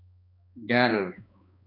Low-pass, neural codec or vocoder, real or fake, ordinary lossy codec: 5.4 kHz; codec, 16 kHz, 2 kbps, X-Codec, HuBERT features, trained on balanced general audio; fake; AAC, 48 kbps